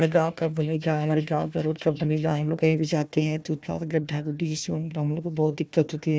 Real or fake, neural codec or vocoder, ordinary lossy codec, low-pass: fake; codec, 16 kHz, 1 kbps, FunCodec, trained on Chinese and English, 50 frames a second; none; none